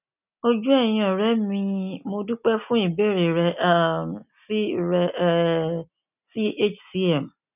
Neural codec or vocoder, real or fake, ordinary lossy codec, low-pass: none; real; none; 3.6 kHz